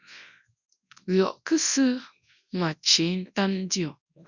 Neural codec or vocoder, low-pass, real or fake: codec, 24 kHz, 0.9 kbps, WavTokenizer, large speech release; 7.2 kHz; fake